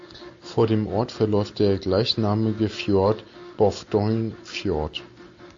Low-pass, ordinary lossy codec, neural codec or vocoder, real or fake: 7.2 kHz; AAC, 64 kbps; none; real